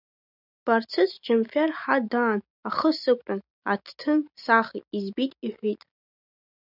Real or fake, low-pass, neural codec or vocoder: real; 5.4 kHz; none